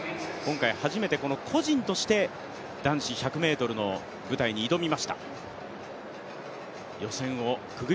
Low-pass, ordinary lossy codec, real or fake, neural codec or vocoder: none; none; real; none